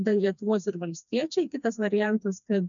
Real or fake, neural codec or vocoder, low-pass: fake; codec, 16 kHz, 2 kbps, FreqCodec, smaller model; 7.2 kHz